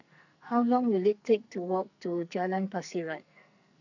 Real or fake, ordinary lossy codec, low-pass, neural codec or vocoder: fake; none; 7.2 kHz; codec, 44.1 kHz, 2.6 kbps, SNAC